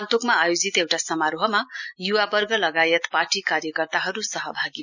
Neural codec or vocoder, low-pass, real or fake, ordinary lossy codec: none; 7.2 kHz; real; none